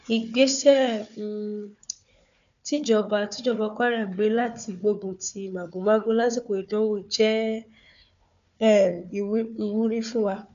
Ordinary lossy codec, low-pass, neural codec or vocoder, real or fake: MP3, 96 kbps; 7.2 kHz; codec, 16 kHz, 4 kbps, FunCodec, trained on Chinese and English, 50 frames a second; fake